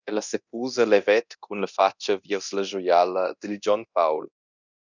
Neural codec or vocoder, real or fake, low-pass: codec, 24 kHz, 0.9 kbps, DualCodec; fake; 7.2 kHz